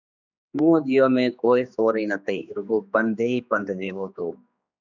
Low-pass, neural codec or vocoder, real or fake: 7.2 kHz; codec, 16 kHz, 2 kbps, X-Codec, HuBERT features, trained on general audio; fake